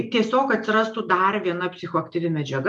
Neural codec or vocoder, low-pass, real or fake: none; 10.8 kHz; real